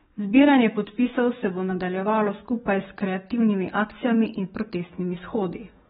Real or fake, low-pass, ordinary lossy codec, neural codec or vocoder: fake; 19.8 kHz; AAC, 16 kbps; codec, 44.1 kHz, 7.8 kbps, DAC